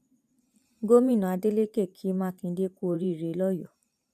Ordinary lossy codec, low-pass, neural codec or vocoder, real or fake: none; 14.4 kHz; vocoder, 44.1 kHz, 128 mel bands every 512 samples, BigVGAN v2; fake